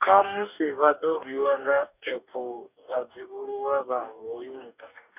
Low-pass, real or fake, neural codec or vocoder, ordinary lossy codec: 3.6 kHz; fake; codec, 44.1 kHz, 2.6 kbps, DAC; none